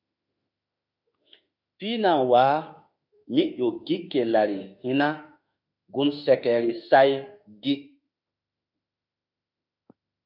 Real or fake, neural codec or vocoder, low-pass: fake; autoencoder, 48 kHz, 32 numbers a frame, DAC-VAE, trained on Japanese speech; 5.4 kHz